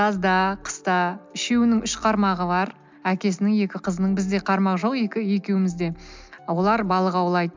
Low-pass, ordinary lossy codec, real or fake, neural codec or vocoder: 7.2 kHz; MP3, 64 kbps; real; none